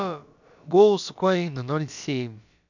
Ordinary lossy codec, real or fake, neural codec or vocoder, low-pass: none; fake; codec, 16 kHz, about 1 kbps, DyCAST, with the encoder's durations; 7.2 kHz